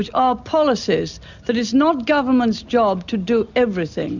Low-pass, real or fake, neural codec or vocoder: 7.2 kHz; real; none